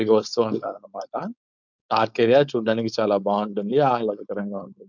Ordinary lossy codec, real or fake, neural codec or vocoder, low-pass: none; fake; codec, 16 kHz, 4.8 kbps, FACodec; 7.2 kHz